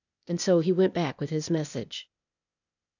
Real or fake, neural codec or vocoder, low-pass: fake; codec, 16 kHz, 0.8 kbps, ZipCodec; 7.2 kHz